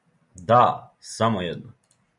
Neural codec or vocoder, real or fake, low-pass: none; real; 10.8 kHz